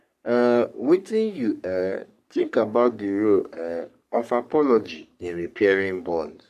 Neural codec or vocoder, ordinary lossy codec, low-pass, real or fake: codec, 44.1 kHz, 3.4 kbps, Pupu-Codec; AAC, 96 kbps; 14.4 kHz; fake